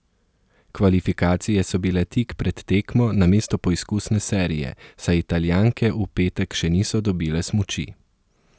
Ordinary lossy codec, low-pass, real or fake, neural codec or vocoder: none; none; real; none